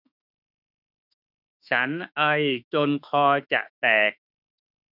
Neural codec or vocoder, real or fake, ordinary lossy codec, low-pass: autoencoder, 48 kHz, 32 numbers a frame, DAC-VAE, trained on Japanese speech; fake; none; 5.4 kHz